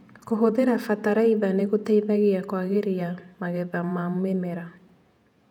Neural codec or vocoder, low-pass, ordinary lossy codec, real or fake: vocoder, 48 kHz, 128 mel bands, Vocos; 19.8 kHz; none; fake